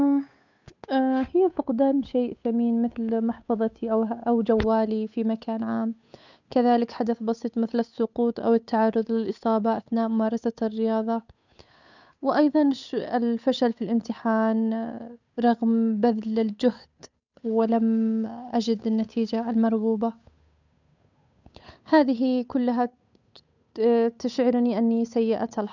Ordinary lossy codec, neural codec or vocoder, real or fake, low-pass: none; codec, 16 kHz, 8 kbps, FunCodec, trained on Chinese and English, 25 frames a second; fake; 7.2 kHz